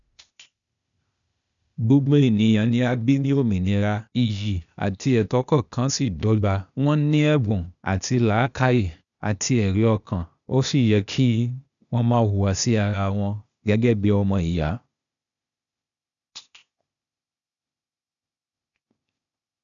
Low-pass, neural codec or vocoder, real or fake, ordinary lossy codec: 7.2 kHz; codec, 16 kHz, 0.8 kbps, ZipCodec; fake; none